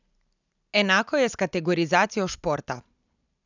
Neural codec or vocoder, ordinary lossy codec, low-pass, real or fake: none; none; 7.2 kHz; real